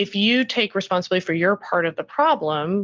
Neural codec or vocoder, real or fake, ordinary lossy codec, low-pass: none; real; Opus, 32 kbps; 7.2 kHz